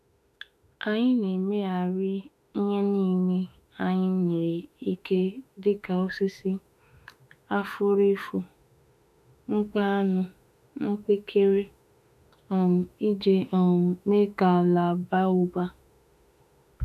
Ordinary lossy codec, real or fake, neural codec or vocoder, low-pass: none; fake; autoencoder, 48 kHz, 32 numbers a frame, DAC-VAE, trained on Japanese speech; 14.4 kHz